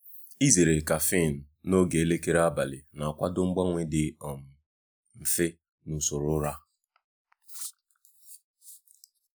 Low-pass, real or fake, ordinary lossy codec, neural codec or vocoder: none; real; none; none